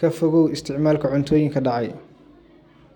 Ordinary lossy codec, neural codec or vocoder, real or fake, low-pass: none; none; real; 19.8 kHz